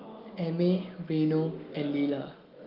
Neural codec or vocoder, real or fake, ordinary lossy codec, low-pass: none; real; Opus, 32 kbps; 5.4 kHz